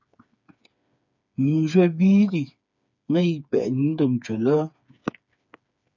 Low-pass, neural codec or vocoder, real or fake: 7.2 kHz; codec, 16 kHz, 4 kbps, FreqCodec, smaller model; fake